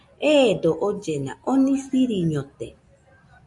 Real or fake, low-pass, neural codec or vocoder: real; 10.8 kHz; none